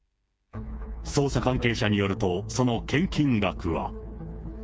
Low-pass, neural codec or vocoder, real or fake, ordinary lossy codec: none; codec, 16 kHz, 4 kbps, FreqCodec, smaller model; fake; none